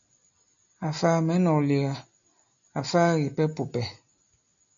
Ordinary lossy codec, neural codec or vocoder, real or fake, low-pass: MP3, 64 kbps; none; real; 7.2 kHz